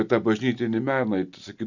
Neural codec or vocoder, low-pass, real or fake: none; 7.2 kHz; real